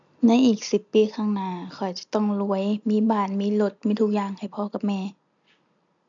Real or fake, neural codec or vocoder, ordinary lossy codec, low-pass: real; none; none; 7.2 kHz